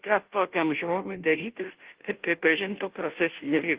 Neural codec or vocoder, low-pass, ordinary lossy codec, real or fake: codec, 16 kHz, 0.5 kbps, FunCodec, trained on Chinese and English, 25 frames a second; 3.6 kHz; Opus, 16 kbps; fake